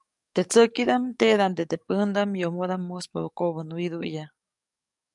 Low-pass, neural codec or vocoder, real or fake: 10.8 kHz; codec, 44.1 kHz, 7.8 kbps, DAC; fake